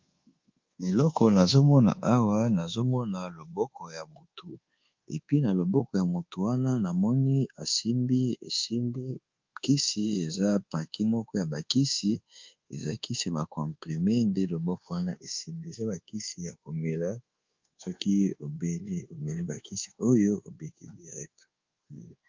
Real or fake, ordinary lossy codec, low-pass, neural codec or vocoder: fake; Opus, 32 kbps; 7.2 kHz; codec, 24 kHz, 1.2 kbps, DualCodec